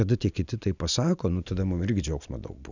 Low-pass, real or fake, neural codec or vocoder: 7.2 kHz; real; none